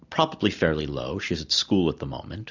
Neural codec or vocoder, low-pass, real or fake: none; 7.2 kHz; real